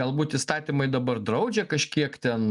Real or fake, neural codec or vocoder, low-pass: real; none; 10.8 kHz